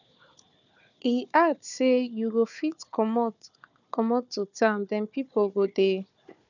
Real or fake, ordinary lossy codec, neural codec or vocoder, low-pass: fake; none; codec, 16 kHz, 4 kbps, FunCodec, trained on Chinese and English, 50 frames a second; 7.2 kHz